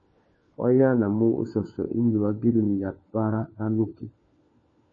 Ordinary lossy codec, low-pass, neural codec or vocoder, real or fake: MP3, 32 kbps; 7.2 kHz; codec, 16 kHz, 4 kbps, FunCodec, trained on LibriTTS, 50 frames a second; fake